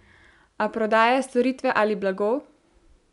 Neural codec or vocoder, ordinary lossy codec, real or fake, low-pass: none; none; real; 10.8 kHz